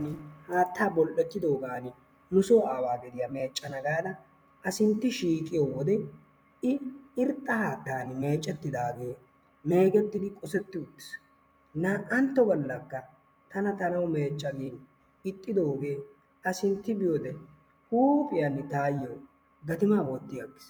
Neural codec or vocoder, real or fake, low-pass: vocoder, 44.1 kHz, 128 mel bands every 256 samples, BigVGAN v2; fake; 19.8 kHz